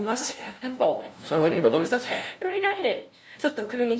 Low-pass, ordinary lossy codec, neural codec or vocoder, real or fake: none; none; codec, 16 kHz, 0.5 kbps, FunCodec, trained on LibriTTS, 25 frames a second; fake